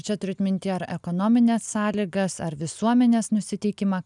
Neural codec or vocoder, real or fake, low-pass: none; real; 10.8 kHz